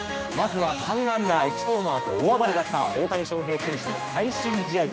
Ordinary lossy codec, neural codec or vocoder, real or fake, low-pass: none; codec, 16 kHz, 2 kbps, X-Codec, HuBERT features, trained on general audio; fake; none